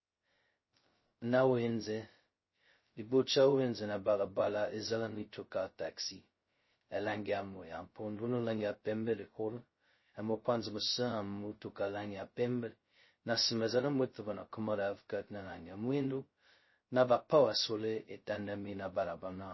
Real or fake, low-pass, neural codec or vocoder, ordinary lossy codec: fake; 7.2 kHz; codec, 16 kHz, 0.2 kbps, FocalCodec; MP3, 24 kbps